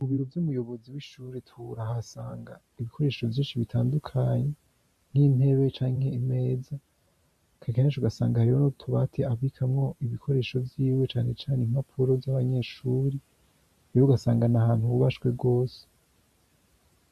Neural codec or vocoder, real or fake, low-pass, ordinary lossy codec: vocoder, 44.1 kHz, 128 mel bands every 512 samples, BigVGAN v2; fake; 14.4 kHz; MP3, 64 kbps